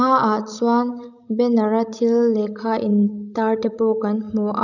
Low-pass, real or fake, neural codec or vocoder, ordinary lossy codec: 7.2 kHz; fake; codec, 16 kHz, 16 kbps, FreqCodec, larger model; none